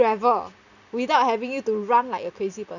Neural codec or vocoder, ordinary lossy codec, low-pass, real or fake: none; none; 7.2 kHz; real